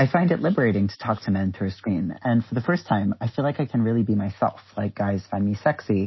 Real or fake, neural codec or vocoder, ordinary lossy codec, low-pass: real; none; MP3, 24 kbps; 7.2 kHz